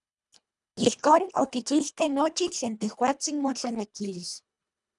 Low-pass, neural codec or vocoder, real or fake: 10.8 kHz; codec, 24 kHz, 1.5 kbps, HILCodec; fake